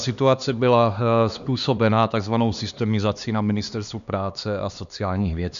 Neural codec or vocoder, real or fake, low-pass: codec, 16 kHz, 2 kbps, X-Codec, HuBERT features, trained on LibriSpeech; fake; 7.2 kHz